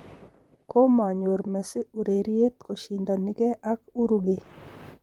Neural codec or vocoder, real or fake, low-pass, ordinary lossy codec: vocoder, 44.1 kHz, 128 mel bands, Pupu-Vocoder; fake; 19.8 kHz; Opus, 32 kbps